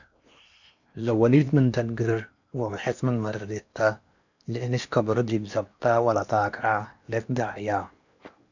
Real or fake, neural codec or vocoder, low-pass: fake; codec, 16 kHz in and 24 kHz out, 0.8 kbps, FocalCodec, streaming, 65536 codes; 7.2 kHz